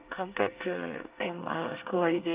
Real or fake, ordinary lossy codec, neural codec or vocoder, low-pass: fake; Opus, 24 kbps; codec, 24 kHz, 1 kbps, SNAC; 3.6 kHz